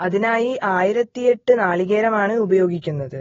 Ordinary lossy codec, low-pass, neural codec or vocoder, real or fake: AAC, 24 kbps; 7.2 kHz; none; real